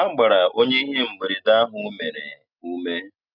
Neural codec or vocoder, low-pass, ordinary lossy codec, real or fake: none; 5.4 kHz; none; real